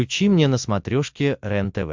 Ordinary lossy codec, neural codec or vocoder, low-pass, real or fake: MP3, 64 kbps; none; 7.2 kHz; real